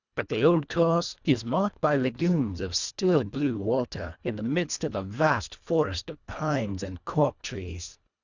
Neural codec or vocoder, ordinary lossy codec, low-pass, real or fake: codec, 24 kHz, 1.5 kbps, HILCodec; Opus, 64 kbps; 7.2 kHz; fake